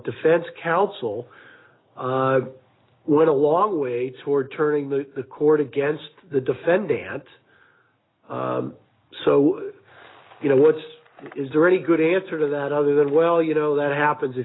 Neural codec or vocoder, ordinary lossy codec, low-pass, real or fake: none; AAC, 16 kbps; 7.2 kHz; real